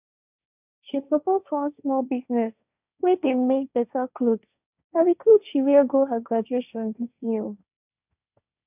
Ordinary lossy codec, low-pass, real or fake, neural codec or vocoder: none; 3.6 kHz; fake; codec, 16 kHz, 1.1 kbps, Voila-Tokenizer